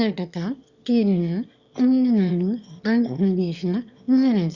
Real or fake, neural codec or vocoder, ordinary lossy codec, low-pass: fake; autoencoder, 22.05 kHz, a latent of 192 numbers a frame, VITS, trained on one speaker; Opus, 64 kbps; 7.2 kHz